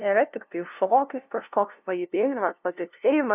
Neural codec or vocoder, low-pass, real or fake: codec, 16 kHz, 0.5 kbps, FunCodec, trained on LibriTTS, 25 frames a second; 3.6 kHz; fake